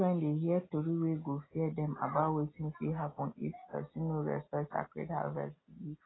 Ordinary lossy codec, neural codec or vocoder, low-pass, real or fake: AAC, 16 kbps; none; 7.2 kHz; real